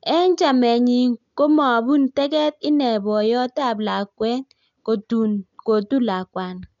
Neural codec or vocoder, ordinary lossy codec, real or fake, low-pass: none; none; real; 7.2 kHz